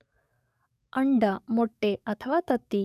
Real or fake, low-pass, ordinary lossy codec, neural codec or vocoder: fake; 14.4 kHz; none; codec, 44.1 kHz, 7.8 kbps, DAC